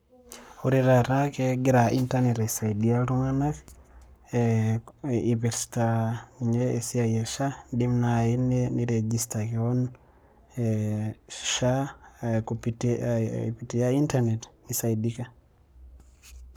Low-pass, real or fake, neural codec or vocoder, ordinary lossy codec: none; fake; codec, 44.1 kHz, 7.8 kbps, Pupu-Codec; none